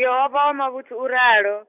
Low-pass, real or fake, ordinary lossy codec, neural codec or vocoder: 3.6 kHz; real; none; none